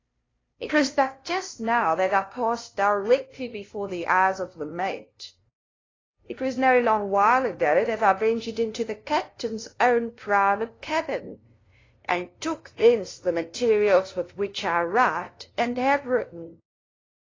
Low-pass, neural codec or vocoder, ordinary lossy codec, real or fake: 7.2 kHz; codec, 16 kHz, 0.5 kbps, FunCodec, trained on LibriTTS, 25 frames a second; AAC, 32 kbps; fake